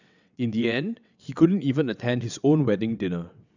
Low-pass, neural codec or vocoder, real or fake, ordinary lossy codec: 7.2 kHz; vocoder, 22.05 kHz, 80 mel bands, WaveNeXt; fake; none